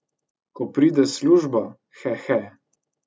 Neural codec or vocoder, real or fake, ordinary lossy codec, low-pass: none; real; none; none